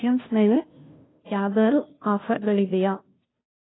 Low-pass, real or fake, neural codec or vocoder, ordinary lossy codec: 7.2 kHz; fake; codec, 16 kHz, 0.5 kbps, FunCodec, trained on Chinese and English, 25 frames a second; AAC, 16 kbps